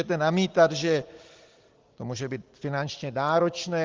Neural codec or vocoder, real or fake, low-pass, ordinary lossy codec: none; real; 7.2 kHz; Opus, 16 kbps